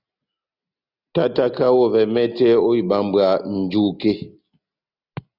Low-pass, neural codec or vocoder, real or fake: 5.4 kHz; none; real